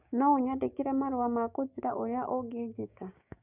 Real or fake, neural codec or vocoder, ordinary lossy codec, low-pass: fake; codec, 16 kHz, 6 kbps, DAC; none; 3.6 kHz